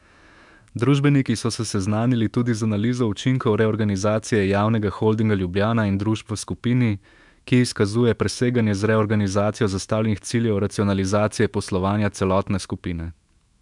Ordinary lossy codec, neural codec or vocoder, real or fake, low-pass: MP3, 96 kbps; autoencoder, 48 kHz, 128 numbers a frame, DAC-VAE, trained on Japanese speech; fake; 10.8 kHz